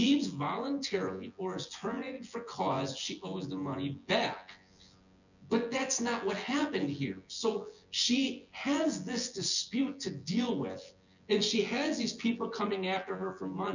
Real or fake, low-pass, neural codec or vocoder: fake; 7.2 kHz; vocoder, 24 kHz, 100 mel bands, Vocos